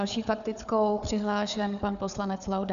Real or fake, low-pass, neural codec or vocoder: fake; 7.2 kHz; codec, 16 kHz, 4 kbps, FunCodec, trained on Chinese and English, 50 frames a second